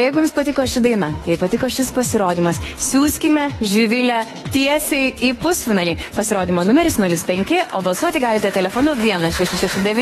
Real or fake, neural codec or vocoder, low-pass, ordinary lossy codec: fake; autoencoder, 48 kHz, 32 numbers a frame, DAC-VAE, trained on Japanese speech; 19.8 kHz; AAC, 32 kbps